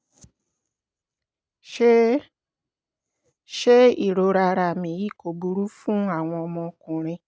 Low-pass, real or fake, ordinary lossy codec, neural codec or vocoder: none; real; none; none